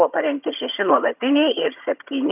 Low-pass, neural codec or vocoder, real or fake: 3.6 kHz; vocoder, 22.05 kHz, 80 mel bands, HiFi-GAN; fake